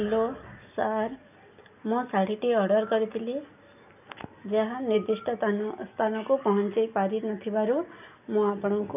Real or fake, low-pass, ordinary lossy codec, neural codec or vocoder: real; 3.6 kHz; none; none